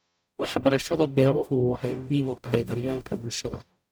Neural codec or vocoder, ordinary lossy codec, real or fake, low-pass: codec, 44.1 kHz, 0.9 kbps, DAC; none; fake; none